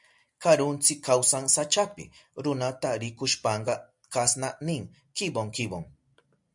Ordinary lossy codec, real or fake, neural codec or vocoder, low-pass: MP3, 64 kbps; real; none; 10.8 kHz